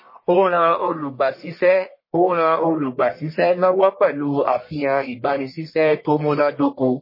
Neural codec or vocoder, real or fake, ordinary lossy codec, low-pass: codec, 44.1 kHz, 1.7 kbps, Pupu-Codec; fake; MP3, 24 kbps; 5.4 kHz